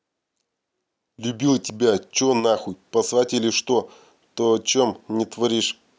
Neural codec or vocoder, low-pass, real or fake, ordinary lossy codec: none; none; real; none